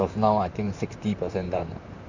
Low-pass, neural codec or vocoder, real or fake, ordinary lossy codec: 7.2 kHz; vocoder, 44.1 kHz, 128 mel bands, Pupu-Vocoder; fake; none